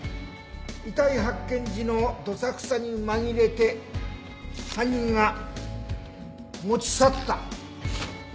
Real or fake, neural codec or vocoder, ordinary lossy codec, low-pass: real; none; none; none